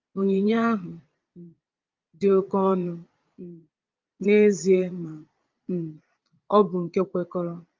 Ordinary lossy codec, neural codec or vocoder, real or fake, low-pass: Opus, 24 kbps; vocoder, 22.05 kHz, 80 mel bands, Vocos; fake; 7.2 kHz